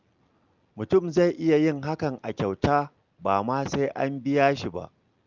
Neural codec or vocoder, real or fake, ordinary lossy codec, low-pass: none; real; Opus, 24 kbps; 7.2 kHz